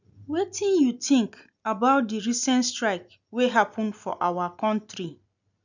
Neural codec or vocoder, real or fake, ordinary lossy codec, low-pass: none; real; none; 7.2 kHz